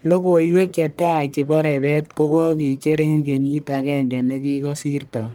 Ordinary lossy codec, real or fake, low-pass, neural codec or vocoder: none; fake; none; codec, 44.1 kHz, 1.7 kbps, Pupu-Codec